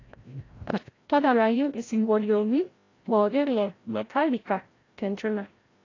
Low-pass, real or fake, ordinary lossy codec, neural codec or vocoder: 7.2 kHz; fake; AAC, 32 kbps; codec, 16 kHz, 0.5 kbps, FreqCodec, larger model